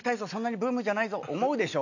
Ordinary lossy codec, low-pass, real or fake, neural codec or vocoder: none; 7.2 kHz; real; none